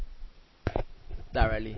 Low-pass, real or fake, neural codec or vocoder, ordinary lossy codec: 7.2 kHz; real; none; MP3, 24 kbps